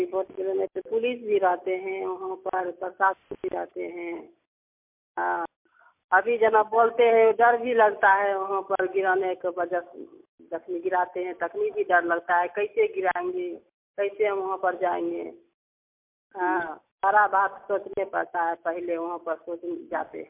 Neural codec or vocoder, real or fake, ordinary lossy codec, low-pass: none; real; MP3, 32 kbps; 3.6 kHz